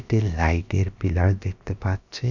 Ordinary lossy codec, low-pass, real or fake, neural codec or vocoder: none; 7.2 kHz; fake; codec, 16 kHz, about 1 kbps, DyCAST, with the encoder's durations